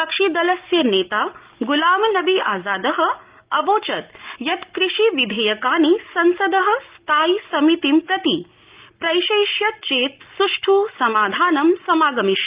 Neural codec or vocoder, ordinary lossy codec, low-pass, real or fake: none; Opus, 32 kbps; 3.6 kHz; real